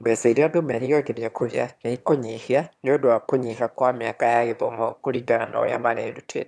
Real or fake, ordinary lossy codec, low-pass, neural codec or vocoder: fake; none; none; autoencoder, 22.05 kHz, a latent of 192 numbers a frame, VITS, trained on one speaker